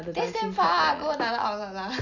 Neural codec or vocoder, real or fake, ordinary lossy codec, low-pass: none; real; none; 7.2 kHz